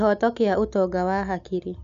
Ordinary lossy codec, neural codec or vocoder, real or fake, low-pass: none; none; real; 7.2 kHz